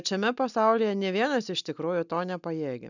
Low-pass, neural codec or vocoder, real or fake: 7.2 kHz; none; real